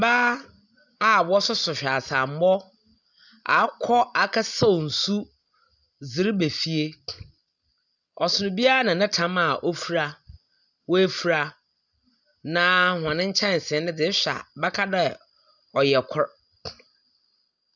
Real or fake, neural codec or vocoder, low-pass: real; none; 7.2 kHz